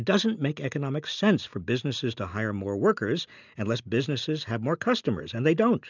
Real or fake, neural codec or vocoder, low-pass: real; none; 7.2 kHz